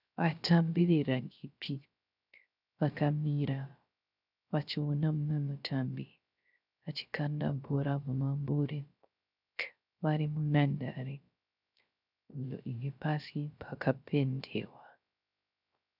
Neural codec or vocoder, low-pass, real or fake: codec, 16 kHz, 0.3 kbps, FocalCodec; 5.4 kHz; fake